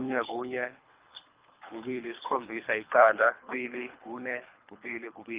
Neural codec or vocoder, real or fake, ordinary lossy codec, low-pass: codec, 24 kHz, 3 kbps, HILCodec; fake; Opus, 32 kbps; 3.6 kHz